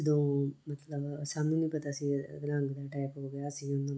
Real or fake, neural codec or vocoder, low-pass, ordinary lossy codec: real; none; none; none